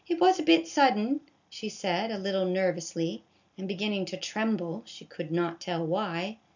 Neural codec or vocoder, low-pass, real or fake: none; 7.2 kHz; real